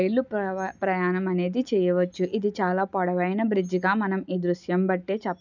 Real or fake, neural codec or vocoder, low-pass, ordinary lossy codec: real; none; 7.2 kHz; none